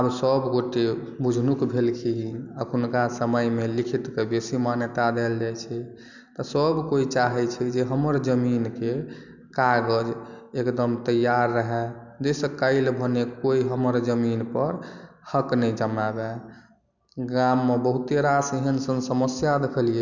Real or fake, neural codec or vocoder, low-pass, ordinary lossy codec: real; none; 7.2 kHz; none